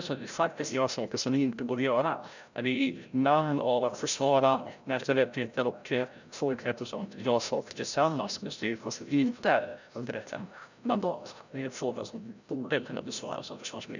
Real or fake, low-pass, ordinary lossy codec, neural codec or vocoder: fake; 7.2 kHz; none; codec, 16 kHz, 0.5 kbps, FreqCodec, larger model